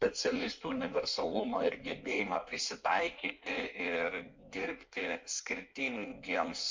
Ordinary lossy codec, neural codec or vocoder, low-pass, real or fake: MP3, 64 kbps; codec, 16 kHz in and 24 kHz out, 1.1 kbps, FireRedTTS-2 codec; 7.2 kHz; fake